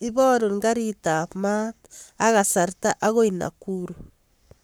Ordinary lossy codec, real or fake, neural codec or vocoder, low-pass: none; fake; codec, 44.1 kHz, 7.8 kbps, Pupu-Codec; none